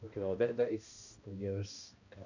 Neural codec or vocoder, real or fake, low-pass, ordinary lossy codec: codec, 16 kHz, 1 kbps, X-Codec, HuBERT features, trained on balanced general audio; fake; 7.2 kHz; none